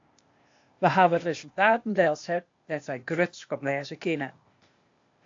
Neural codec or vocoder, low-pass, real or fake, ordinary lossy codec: codec, 16 kHz, 0.8 kbps, ZipCodec; 7.2 kHz; fake; AAC, 48 kbps